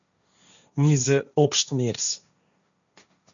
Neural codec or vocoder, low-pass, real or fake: codec, 16 kHz, 1.1 kbps, Voila-Tokenizer; 7.2 kHz; fake